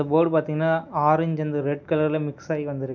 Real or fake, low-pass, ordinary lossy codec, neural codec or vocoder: real; 7.2 kHz; none; none